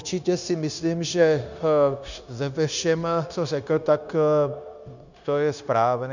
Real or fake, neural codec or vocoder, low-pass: fake; codec, 16 kHz, 0.9 kbps, LongCat-Audio-Codec; 7.2 kHz